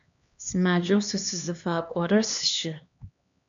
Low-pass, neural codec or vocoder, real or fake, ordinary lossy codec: 7.2 kHz; codec, 16 kHz, 2 kbps, X-Codec, HuBERT features, trained on LibriSpeech; fake; MP3, 64 kbps